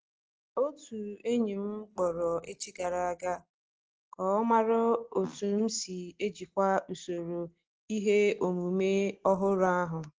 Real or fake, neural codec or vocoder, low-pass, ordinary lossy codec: real; none; 7.2 kHz; Opus, 16 kbps